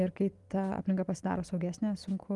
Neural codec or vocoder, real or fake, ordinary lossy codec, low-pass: none; real; Opus, 24 kbps; 10.8 kHz